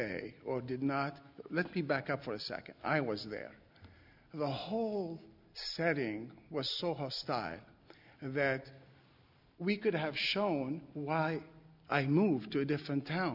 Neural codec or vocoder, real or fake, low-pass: none; real; 5.4 kHz